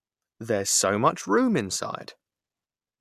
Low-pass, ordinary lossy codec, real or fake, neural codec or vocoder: 14.4 kHz; AAC, 96 kbps; real; none